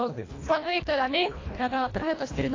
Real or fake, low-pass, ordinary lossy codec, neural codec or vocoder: fake; 7.2 kHz; AAC, 32 kbps; codec, 24 kHz, 1.5 kbps, HILCodec